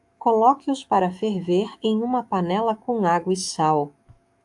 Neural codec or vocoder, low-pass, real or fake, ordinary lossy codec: autoencoder, 48 kHz, 128 numbers a frame, DAC-VAE, trained on Japanese speech; 10.8 kHz; fake; AAC, 64 kbps